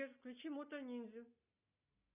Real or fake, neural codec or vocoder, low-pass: real; none; 3.6 kHz